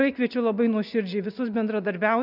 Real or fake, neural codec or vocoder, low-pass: real; none; 5.4 kHz